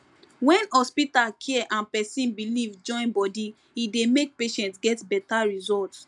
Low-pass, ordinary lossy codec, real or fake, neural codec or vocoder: 10.8 kHz; none; real; none